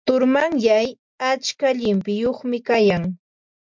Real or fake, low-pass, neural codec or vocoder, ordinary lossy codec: real; 7.2 kHz; none; MP3, 64 kbps